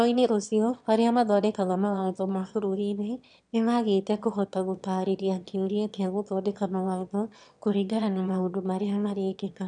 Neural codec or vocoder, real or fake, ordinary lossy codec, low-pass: autoencoder, 22.05 kHz, a latent of 192 numbers a frame, VITS, trained on one speaker; fake; none; 9.9 kHz